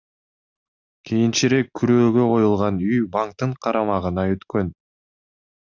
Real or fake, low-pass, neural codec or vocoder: real; 7.2 kHz; none